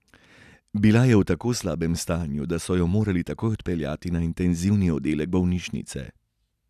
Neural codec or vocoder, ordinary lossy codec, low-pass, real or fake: none; none; 14.4 kHz; real